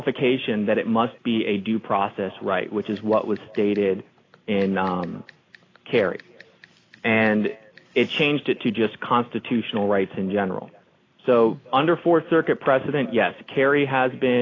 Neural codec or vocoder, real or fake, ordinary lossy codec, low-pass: none; real; AAC, 32 kbps; 7.2 kHz